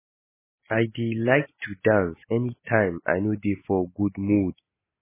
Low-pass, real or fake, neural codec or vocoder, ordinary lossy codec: 3.6 kHz; real; none; MP3, 16 kbps